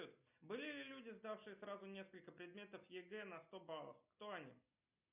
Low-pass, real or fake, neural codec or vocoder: 3.6 kHz; real; none